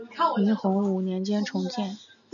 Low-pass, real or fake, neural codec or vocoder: 7.2 kHz; real; none